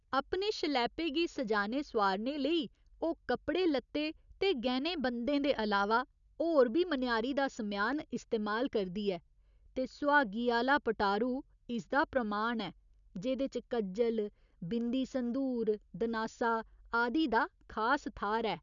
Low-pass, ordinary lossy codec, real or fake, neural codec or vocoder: 7.2 kHz; none; real; none